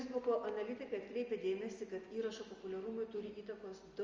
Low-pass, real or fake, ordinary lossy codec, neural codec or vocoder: 7.2 kHz; real; Opus, 32 kbps; none